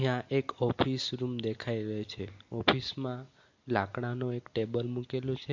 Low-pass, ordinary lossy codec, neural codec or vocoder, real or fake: 7.2 kHz; MP3, 48 kbps; none; real